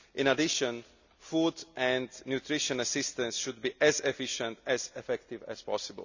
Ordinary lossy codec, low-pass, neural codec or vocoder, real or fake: none; 7.2 kHz; none; real